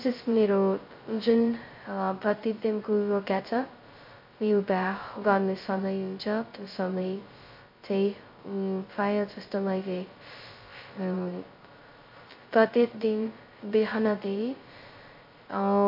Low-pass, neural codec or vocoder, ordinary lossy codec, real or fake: 5.4 kHz; codec, 16 kHz, 0.2 kbps, FocalCodec; MP3, 32 kbps; fake